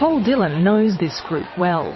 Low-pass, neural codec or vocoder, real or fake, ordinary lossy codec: 7.2 kHz; vocoder, 22.05 kHz, 80 mel bands, WaveNeXt; fake; MP3, 24 kbps